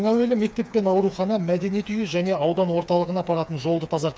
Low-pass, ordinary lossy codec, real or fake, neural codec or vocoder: none; none; fake; codec, 16 kHz, 4 kbps, FreqCodec, smaller model